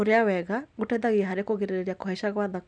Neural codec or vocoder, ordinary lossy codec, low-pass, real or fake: none; none; 9.9 kHz; real